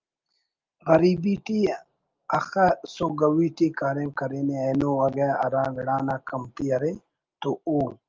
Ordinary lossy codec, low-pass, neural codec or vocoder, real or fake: Opus, 24 kbps; 7.2 kHz; none; real